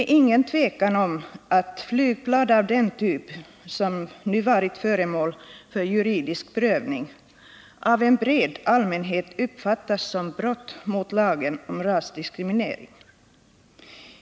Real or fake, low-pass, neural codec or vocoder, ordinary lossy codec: real; none; none; none